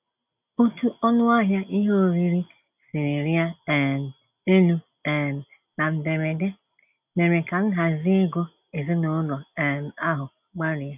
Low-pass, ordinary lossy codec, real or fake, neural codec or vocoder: 3.6 kHz; none; real; none